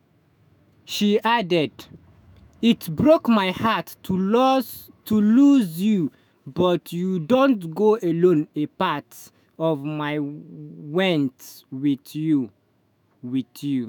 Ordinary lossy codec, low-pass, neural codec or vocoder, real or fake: none; none; autoencoder, 48 kHz, 128 numbers a frame, DAC-VAE, trained on Japanese speech; fake